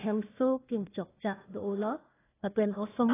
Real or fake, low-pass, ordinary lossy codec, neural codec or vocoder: fake; 3.6 kHz; AAC, 16 kbps; codec, 16 kHz, 1 kbps, FunCodec, trained on Chinese and English, 50 frames a second